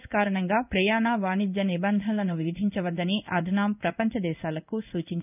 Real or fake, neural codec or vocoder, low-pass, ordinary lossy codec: fake; codec, 16 kHz in and 24 kHz out, 1 kbps, XY-Tokenizer; 3.6 kHz; none